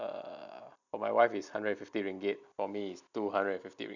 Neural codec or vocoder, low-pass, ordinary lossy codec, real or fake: none; 7.2 kHz; none; real